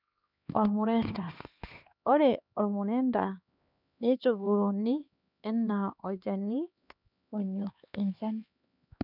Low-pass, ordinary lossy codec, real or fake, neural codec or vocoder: 5.4 kHz; none; fake; codec, 16 kHz, 2 kbps, X-Codec, HuBERT features, trained on LibriSpeech